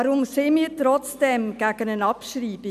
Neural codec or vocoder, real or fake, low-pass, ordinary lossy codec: none; real; 14.4 kHz; none